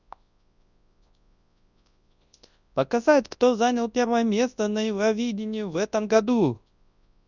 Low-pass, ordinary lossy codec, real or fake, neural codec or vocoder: 7.2 kHz; none; fake; codec, 24 kHz, 0.9 kbps, WavTokenizer, large speech release